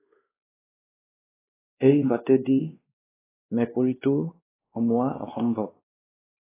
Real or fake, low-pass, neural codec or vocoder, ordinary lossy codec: fake; 3.6 kHz; codec, 16 kHz, 2 kbps, X-Codec, WavLM features, trained on Multilingual LibriSpeech; AAC, 16 kbps